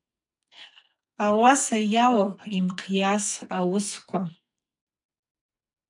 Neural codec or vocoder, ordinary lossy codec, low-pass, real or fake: codec, 44.1 kHz, 2.6 kbps, SNAC; MP3, 96 kbps; 10.8 kHz; fake